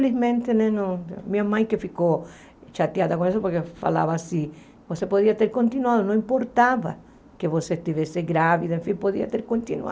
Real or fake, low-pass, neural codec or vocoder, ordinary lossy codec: real; none; none; none